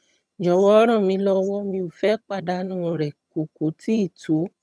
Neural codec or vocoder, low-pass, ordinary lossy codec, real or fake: vocoder, 22.05 kHz, 80 mel bands, HiFi-GAN; none; none; fake